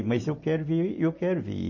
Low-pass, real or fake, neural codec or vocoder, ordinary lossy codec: 7.2 kHz; real; none; MP3, 32 kbps